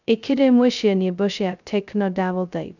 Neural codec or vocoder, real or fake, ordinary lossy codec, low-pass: codec, 16 kHz, 0.2 kbps, FocalCodec; fake; none; 7.2 kHz